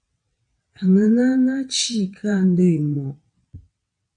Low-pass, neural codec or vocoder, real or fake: 9.9 kHz; vocoder, 22.05 kHz, 80 mel bands, WaveNeXt; fake